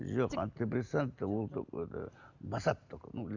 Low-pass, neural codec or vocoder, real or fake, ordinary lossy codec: 7.2 kHz; none; real; Opus, 64 kbps